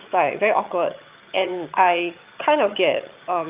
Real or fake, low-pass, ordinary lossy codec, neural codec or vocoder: fake; 3.6 kHz; Opus, 24 kbps; vocoder, 22.05 kHz, 80 mel bands, HiFi-GAN